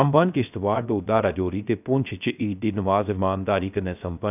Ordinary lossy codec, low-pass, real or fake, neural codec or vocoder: none; 3.6 kHz; fake; codec, 16 kHz, 0.3 kbps, FocalCodec